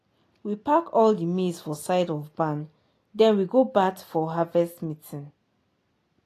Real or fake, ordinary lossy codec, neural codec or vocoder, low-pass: real; AAC, 48 kbps; none; 14.4 kHz